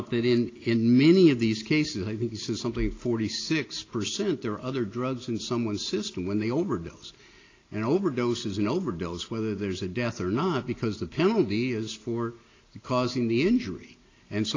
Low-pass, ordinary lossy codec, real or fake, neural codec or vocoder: 7.2 kHz; AAC, 32 kbps; real; none